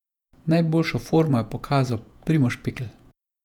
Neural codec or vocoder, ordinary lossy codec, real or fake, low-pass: vocoder, 48 kHz, 128 mel bands, Vocos; none; fake; 19.8 kHz